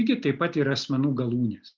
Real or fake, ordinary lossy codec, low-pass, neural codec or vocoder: real; Opus, 32 kbps; 7.2 kHz; none